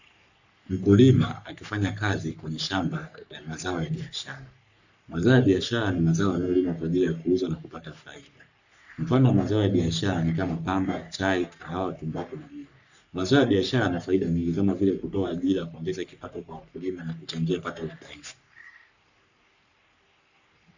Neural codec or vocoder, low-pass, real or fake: codec, 44.1 kHz, 3.4 kbps, Pupu-Codec; 7.2 kHz; fake